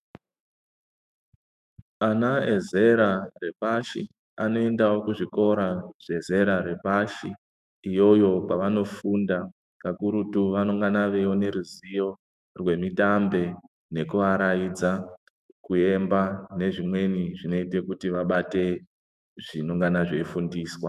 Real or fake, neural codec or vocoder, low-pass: fake; autoencoder, 48 kHz, 128 numbers a frame, DAC-VAE, trained on Japanese speech; 14.4 kHz